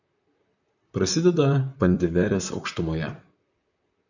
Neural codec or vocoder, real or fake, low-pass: vocoder, 44.1 kHz, 128 mel bands, Pupu-Vocoder; fake; 7.2 kHz